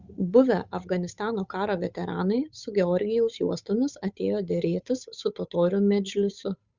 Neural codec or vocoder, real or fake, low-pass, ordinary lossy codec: codec, 16 kHz, 8 kbps, FunCodec, trained on Chinese and English, 25 frames a second; fake; 7.2 kHz; Opus, 64 kbps